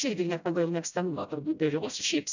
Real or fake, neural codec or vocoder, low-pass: fake; codec, 16 kHz, 0.5 kbps, FreqCodec, smaller model; 7.2 kHz